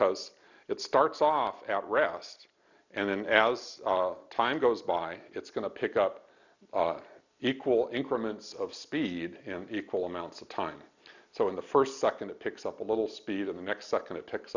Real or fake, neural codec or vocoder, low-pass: real; none; 7.2 kHz